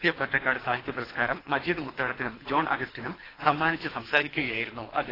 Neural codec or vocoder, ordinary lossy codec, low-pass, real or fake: codec, 24 kHz, 3 kbps, HILCodec; AAC, 24 kbps; 5.4 kHz; fake